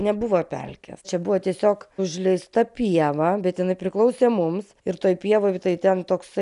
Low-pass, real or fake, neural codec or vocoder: 10.8 kHz; real; none